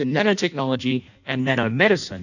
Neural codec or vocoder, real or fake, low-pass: codec, 16 kHz in and 24 kHz out, 0.6 kbps, FireRedTTS-2 codec; fake; 7.2 kHz